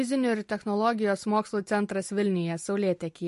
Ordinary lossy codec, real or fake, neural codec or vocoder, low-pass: MP3, 48 kbps; real; none; 14.4 kHz